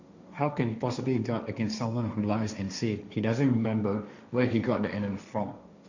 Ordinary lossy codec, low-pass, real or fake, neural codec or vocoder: none; 7.2 kHz; fake; codec, 16 kHz, 1.1 kbps, Voila-Tokenizer